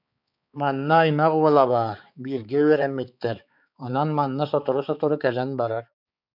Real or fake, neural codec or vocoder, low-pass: fake; codec, 16 kHz, 4 kbps, X-Codec, HuBERT features, trained on balanced general audio; 5.4 kHz